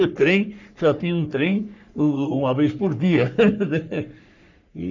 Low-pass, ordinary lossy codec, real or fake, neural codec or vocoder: 7.2 kHz; none; fake; codec, 44.1 kHz, 3.4 kbps, Pupu-Codec